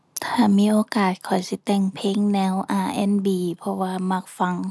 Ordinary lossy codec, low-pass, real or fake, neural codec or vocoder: none; none; real; none